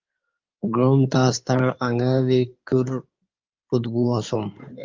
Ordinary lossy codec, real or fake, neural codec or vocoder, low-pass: Opus, 32 kbps; fake; codec, 16 kHz in and 24 kHz out, 2.2 kbps, FireRedTTS-2 codec; 7.2 kHz